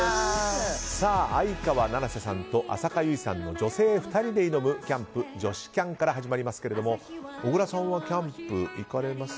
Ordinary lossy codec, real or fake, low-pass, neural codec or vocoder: none; real; none; none